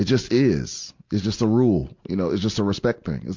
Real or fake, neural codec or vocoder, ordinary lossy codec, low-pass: real; none; MP3, 64 kbps; 7.2 kHz